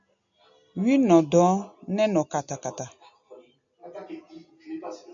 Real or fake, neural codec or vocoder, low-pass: real; none; 7.2 kHz